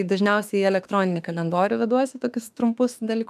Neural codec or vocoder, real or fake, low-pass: autoencoder, 48 kHz, 32 numbers a frame, DAC-VAE, trained on Japanese speech; fake; 14.4 kHz